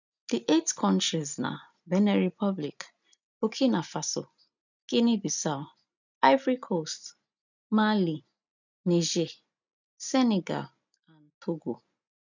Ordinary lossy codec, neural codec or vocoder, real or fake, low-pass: none; none; real; 7.2 kHz